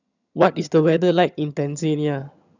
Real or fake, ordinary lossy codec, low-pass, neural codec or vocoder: fake; none; 7.2 kHz; vocoder, 22.05 kHz, 80 mel bands, HiFi-GAN